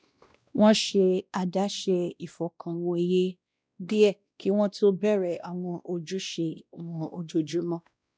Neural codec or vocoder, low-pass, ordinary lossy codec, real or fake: codec, 16 kHz, 1 kbps, X-Codec, WavLM features, trained on Multilingual LibriSpeech; none; none; fake